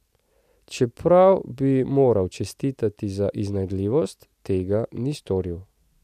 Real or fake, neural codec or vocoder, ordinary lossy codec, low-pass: real; none; none; 14.4 kHz